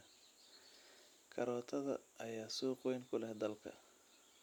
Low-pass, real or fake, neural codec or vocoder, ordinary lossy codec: none; real; none; none